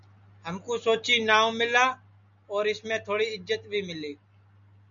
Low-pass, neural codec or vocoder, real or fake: 7.2 kHz; none; real